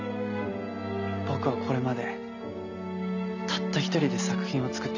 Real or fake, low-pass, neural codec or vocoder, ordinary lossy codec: real; 7.2 kHz; none; none